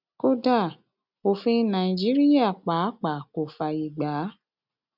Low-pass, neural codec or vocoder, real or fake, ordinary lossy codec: 5.4 kHz; none; real; none